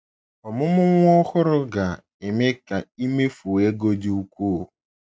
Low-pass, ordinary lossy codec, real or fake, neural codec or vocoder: none; none; real; none